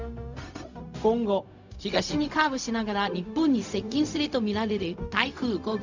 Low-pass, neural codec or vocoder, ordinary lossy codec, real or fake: 7.2 kHz; codec, 16 kHz, 0.4 kbps, LongCat-Audio-Codec; none; fake